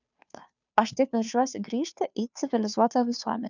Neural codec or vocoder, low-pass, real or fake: codec, 16 kHz, 2 kbps, FunCodec, trained on Chinese and English, 25 frames a second; 7.2 kHz; fake